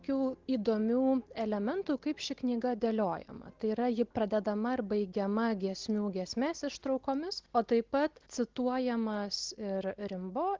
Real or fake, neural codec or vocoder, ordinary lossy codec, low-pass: real; none; Opus, 16 kbps; 7.2 kHz